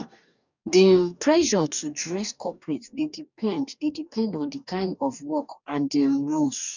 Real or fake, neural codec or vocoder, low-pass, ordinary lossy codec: fake; codec, 44.1 kHz, 2.6 kbps, DAC; 7.2 kHz; none